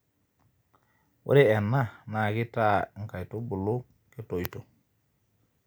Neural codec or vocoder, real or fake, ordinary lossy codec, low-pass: none; real; none; none